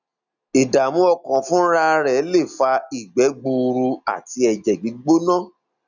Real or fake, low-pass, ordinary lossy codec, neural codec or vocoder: real; 7.2 kHz; none; none